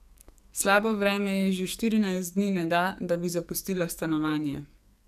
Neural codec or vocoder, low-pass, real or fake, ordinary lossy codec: codec, 44.1 kHz, 2.6 kbps, SNAC; 14.4 kHz; fake; none